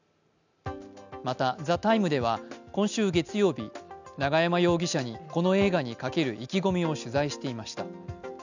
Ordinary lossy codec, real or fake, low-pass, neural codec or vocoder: none; real; 7.2 kHz; none